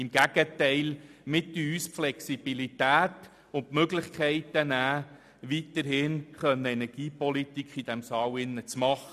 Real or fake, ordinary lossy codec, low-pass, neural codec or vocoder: real; none; 14.4 kHz; none